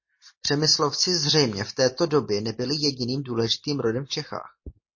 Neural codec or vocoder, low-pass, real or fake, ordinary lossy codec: none; 7.2 kHz; real; MP3, 32 kbps